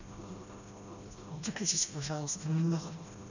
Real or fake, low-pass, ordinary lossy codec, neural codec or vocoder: fake; 7.2 kHz; none; codec, 16 kHz, 1 kbps, FreqCodec, smaller model